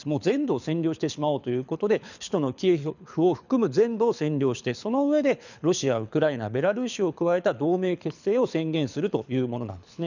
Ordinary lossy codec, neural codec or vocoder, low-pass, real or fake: none; codec, 24 kHz, 6 kbps, HILCodec; 7.2 kHz; fake